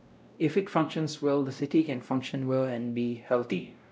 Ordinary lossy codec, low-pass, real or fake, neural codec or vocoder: none; none; fake; codec, 16 kHz, 0.5 kbps, X-Codec, WavLM features, trained on Multilingual LibriSpeech